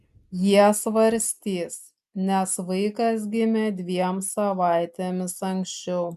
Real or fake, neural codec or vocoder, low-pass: real; none; 14.4 kHz